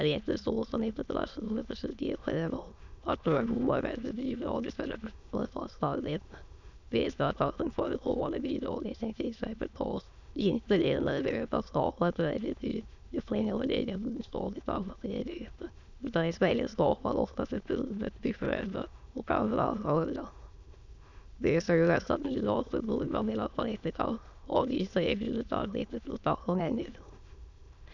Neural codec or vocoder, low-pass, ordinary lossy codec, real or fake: autoencoder, 22.05 kHz, a latent of 192 numbers a frame, VITS, trained on many speakers; 7.2 kHz; none; fake